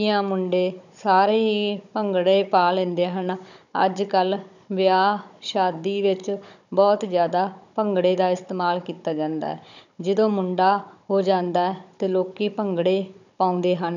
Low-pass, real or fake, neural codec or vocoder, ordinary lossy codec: 7.2 kHz; fake; codec, 16 kHz, 16 kbps, FunCodec, trained on Chinese and English, 50 frames a second; none